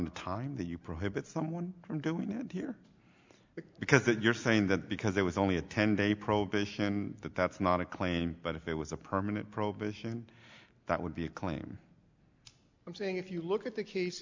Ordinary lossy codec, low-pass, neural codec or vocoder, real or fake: MP3, 64 kbps; 7.2 kHz; none; real